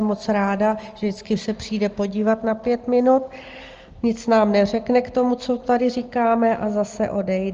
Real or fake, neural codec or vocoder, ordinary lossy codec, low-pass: real; none; Opus, 24 kbps; 7.2 kHz